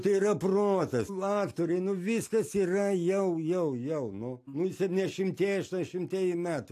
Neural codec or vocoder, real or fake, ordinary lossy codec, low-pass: none; real; AAC, 64 kbps; 14.4 kHz